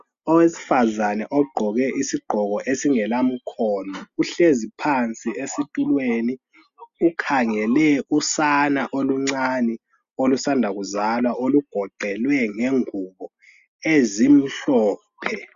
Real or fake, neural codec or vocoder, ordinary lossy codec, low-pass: real; none; Opus, 64 kbps; 7.2 kHz